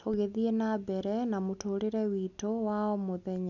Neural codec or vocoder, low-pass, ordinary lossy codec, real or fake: none; 7.2 kHz; none; real